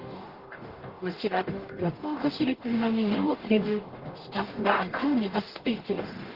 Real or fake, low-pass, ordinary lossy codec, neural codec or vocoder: fake; 5.4 kHz; Opus, 16 kbps; codec, 44.1 kHz, 0.9 kbps, DAC